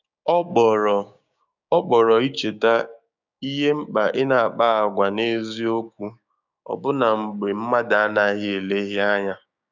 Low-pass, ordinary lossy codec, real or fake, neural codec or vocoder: 7.2 kHz; none; fake; codec, 16 kHz, 6 kbps, DAC